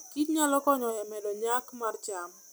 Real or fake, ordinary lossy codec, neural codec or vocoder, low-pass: real; none; none; none